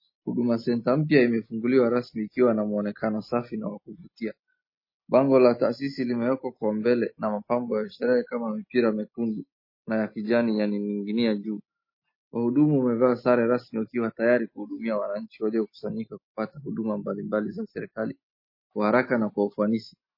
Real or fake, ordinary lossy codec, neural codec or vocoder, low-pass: real; MP3, 24 kbps; none; 5.4 kHz